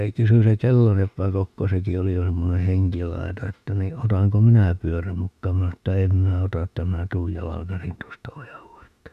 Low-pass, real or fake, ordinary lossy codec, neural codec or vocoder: 14.4 kHz; fake; none; autoencoder, 48 kHz, 32 numbers a frame, DAC-VAE, trained on Japanese speech